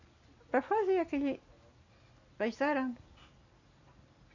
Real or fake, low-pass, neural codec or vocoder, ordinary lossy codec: real; 7.2 kHz; none; none